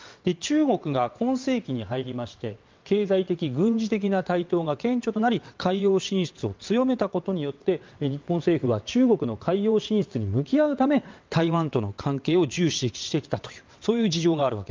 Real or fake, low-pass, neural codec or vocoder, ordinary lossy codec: fake; 7.2 kHz; vocoder, 22.05 kHz, 80 mel bands, WaveNeXt; Opus, 24 kbps